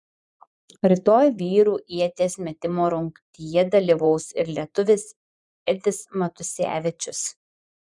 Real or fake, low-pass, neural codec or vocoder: fake; 10.8 kHz; vocoder, 24 kHz, 100 mel bands, Vocos